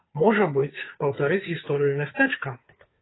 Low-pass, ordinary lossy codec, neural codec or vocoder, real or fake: 7.2 kHz; AAC, 16 kbps; codec, 16 kHz, 2 kbps, FunCodec, trained on Chinese and English, 25 frames a second; fake